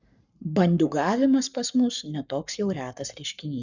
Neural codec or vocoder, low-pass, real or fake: codec, 44.1 kHz, 7.8 kbps, Pupu-Codec; 7.2 kHz; fake